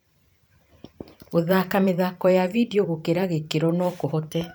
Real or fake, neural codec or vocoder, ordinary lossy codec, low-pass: fake; vocoder, 44.1 kHz, 128 mel bands every 512 samples, BigVGAN v2; none; none